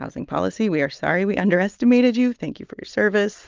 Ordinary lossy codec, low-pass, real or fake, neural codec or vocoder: Opus, 32 kbps; 7.2 kHz; real; none